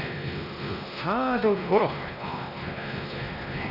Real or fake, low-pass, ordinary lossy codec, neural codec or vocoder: fake; 5.4 kHz; none; codec, 16 kHz, 1 kbps, X-Codec, WavLM features, trained on Multilingual LibriSpeech